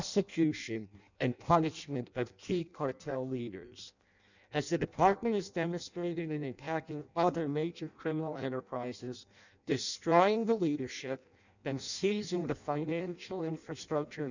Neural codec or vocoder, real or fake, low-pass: codec, 16 kHz in and 24 kHz out, 0.6 kbps, FireRedTTS-2 codec; fake; 7.2 kHz